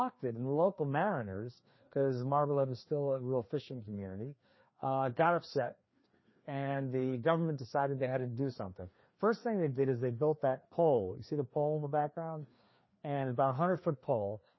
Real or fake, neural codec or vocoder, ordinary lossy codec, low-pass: fake; codec, 16 kHz, 2 kbps, FreqCodec, larger model; MP3, 24 kbps; 7.2 kHz